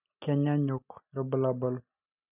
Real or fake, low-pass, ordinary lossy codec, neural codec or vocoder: real; 3.6 kHz; none; none